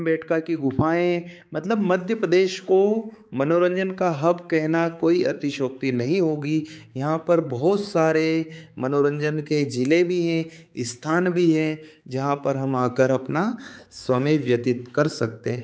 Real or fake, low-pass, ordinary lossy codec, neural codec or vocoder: fake; none; none; codec, 16 kHz, 4 kbps, X-Codec, HuBERT features, trained on balanced general audio